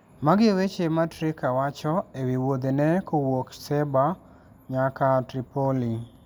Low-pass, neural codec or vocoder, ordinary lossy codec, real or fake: none; none; none; real